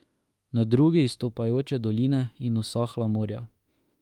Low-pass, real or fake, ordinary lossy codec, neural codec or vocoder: 19.8 kHz; fake; Opus, 32 kbps; autoencoder, 48 kHz, 32 numbers a frame, DAC-VAE, trained on Japanese speech